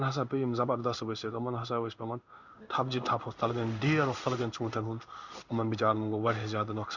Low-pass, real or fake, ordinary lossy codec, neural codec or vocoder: 7.2 kHz; fake; Opus, 64 kbps; codec, 16 kHz in and 24 kHz out, 1 kbps, XY-Tokenizer